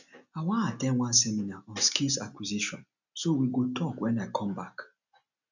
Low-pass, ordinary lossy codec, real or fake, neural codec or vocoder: 7.2 kHz; none; real; none